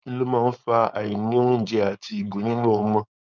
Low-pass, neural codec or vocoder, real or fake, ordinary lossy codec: 7.2 kHz; codec, 16 kHz, 4.8 kbps, FACodec; fake; none